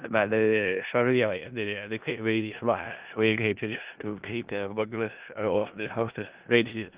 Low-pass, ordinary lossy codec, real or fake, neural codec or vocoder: 3.6 kHz; Opus, 24 kbps; fake; codec, 16 kHz in and 24 kHz out, 0.4 kbps, LongCat-Audio-Codec, four codebook decoder